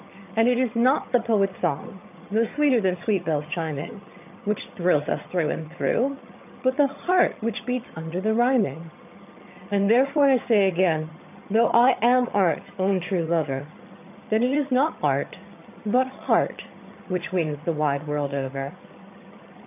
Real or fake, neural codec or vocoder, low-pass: fake; vocoder, 22.05 kHz, 80 mel bands, HiFi-GAN; 3.6 kHz